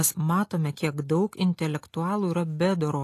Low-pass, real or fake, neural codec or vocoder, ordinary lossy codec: 14.4 kHz; real; none; AAC, 48 kbps